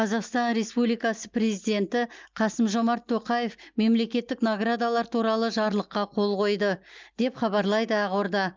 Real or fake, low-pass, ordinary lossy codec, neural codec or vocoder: real; 7.2 kHz; Opus, 32 kbps; none